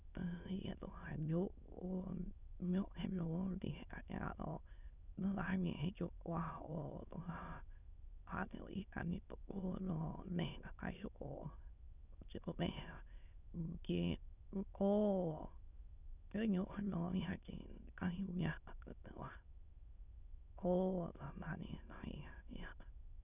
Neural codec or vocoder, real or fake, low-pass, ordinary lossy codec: autoencoder, 22.05 kHz, a latent of 192 numbers a frame, VITS, trained on many speakers; fake; 3.6 kHz; none